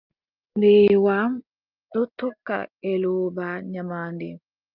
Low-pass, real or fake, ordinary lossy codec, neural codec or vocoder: 5.4 kHz; real; Opus, 32 kbps; none